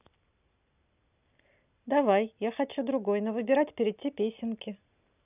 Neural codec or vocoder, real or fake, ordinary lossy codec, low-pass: none; real; none; 3.6 kHz